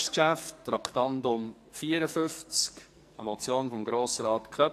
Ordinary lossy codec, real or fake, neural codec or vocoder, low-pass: AAC, 64 kbps; fake; codec, 44.1 kHz, 2.6 kbps, SNAC; 14.4 kHz